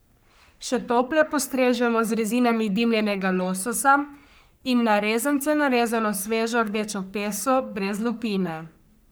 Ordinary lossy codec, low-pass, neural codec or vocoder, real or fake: none; none; codec, 44.1 kHz, 3.4 kbps, Pupu-Codec; fake